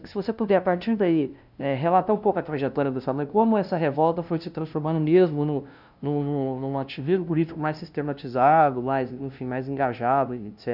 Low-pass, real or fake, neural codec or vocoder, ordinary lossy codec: 5.4 kHz; fake; codec, 16 kHz, 0.5 kbps, FunCodec, trained on LibriTTS, 25 frames a second; none